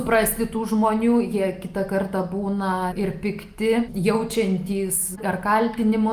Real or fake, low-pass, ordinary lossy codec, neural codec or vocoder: real; 14.4 kHz; Opus, 32 kbps; none